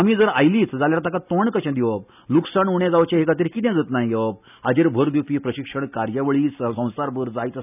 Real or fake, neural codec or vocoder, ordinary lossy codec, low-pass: real; none; none; 3.6 kHz